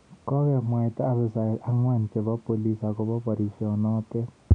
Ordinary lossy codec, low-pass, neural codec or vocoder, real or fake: none; 9.9 kHz; none; real